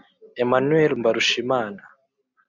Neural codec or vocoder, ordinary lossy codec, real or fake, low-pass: none; MP3, 64 kbps; real; 7.2 kHz